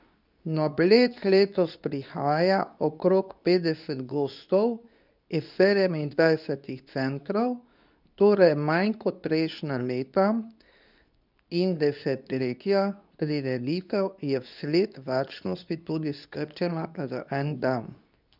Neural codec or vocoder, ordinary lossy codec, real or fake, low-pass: codec, 24 kHz, 0.9 kbps, WavTokenizer, medium speech release version 2; none; fake; 5.4 kHz